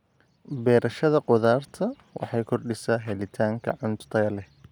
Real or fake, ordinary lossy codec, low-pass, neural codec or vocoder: real; none; 19.8 kHz; none